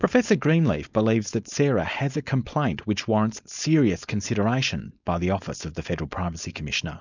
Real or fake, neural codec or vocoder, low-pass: fake; codec, 16 kHz, 4.8 kbps, FACodec; 7.2 kHz